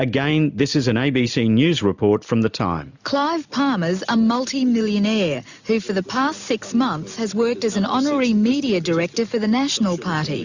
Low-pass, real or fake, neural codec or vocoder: 7.2 kHz; real; none